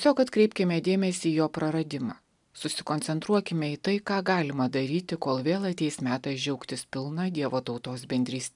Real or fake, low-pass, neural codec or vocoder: real; 10.8 kHz; none